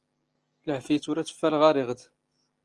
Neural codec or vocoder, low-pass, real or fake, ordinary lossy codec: none; 10.8 kHz; real; Opus, 24 kbps